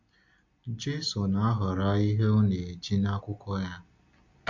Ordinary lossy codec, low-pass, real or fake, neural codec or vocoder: MP3, 48 kbps; 7.2 kHz; real; none